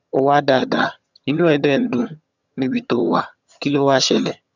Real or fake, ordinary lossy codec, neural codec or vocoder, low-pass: fake; none; vocoder, 22.05 kHz, 80 mel bands, HiFi-GAN; 7.2 kHz